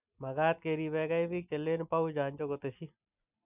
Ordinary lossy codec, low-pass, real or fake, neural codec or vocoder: none; 3.6 kHz; real; none